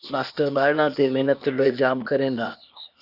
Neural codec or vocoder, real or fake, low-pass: codec, 16 kHz, 0.8 kbps, ZipCodec; fake; 5.4 kHz